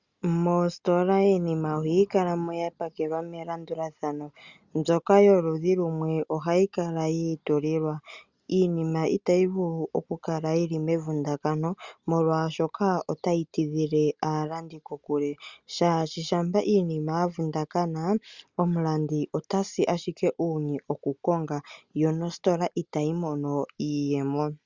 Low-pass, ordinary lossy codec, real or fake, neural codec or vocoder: 7.2 kHz; Opus, 64 kbps; real; none